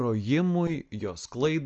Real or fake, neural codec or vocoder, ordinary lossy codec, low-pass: real; none; Opus, 24 kbps; 7.2 kHz